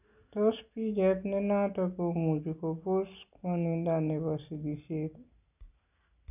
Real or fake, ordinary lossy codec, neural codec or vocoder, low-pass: real; none; none; 3.6 kHz